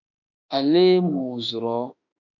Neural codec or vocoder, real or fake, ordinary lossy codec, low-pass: autoencoder, 48 kHz, 32 numbers a frame, DAC-VAE, trained on Japanese speech; fake; MP3, 64 kbps; 7.2 kHz